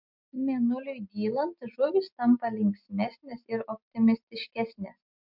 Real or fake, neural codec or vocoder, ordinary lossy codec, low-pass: real; none; MP3, 48 kbps; 5.4 kHz